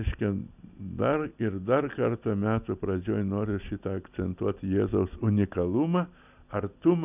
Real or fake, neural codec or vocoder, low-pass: real; none; 3.6 kHz